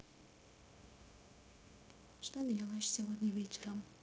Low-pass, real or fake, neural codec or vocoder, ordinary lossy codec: none; fake; codec, 16 kHz, 0.9 kbps, LongCat-Audio-Codec; none